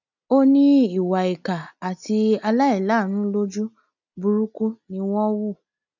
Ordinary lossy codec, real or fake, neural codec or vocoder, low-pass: none; real; none; 7.2 kHz